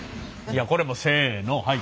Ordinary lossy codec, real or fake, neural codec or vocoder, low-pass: none; real; none; none